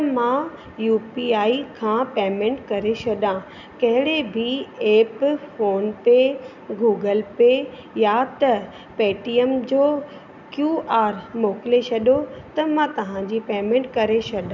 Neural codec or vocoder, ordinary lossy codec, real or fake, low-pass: none; none; real; 7.2 kHz